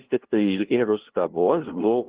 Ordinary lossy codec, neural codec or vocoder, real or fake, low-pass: Opus, 16 kbps; codec, 16 kHz, 0.5 kbps, FunCodec, trained on LibriTTS, 25 frames a second; fake; 3.6 kHz